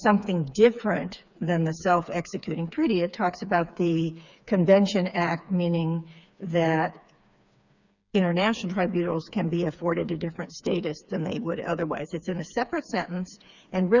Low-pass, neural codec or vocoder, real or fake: 7.2 kHz; codec, 16 kHz, 4 kbps, FreqCodec, smaller model; fake